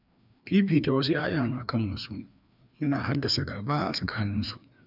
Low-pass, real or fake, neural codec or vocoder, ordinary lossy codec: 5.4 kHz; fake; codec, 16 kHz, 2 kbps, FreqCodec, larger model; none